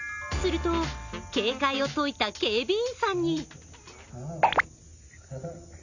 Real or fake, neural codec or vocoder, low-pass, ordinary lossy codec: real; none; 7.2 kHz; none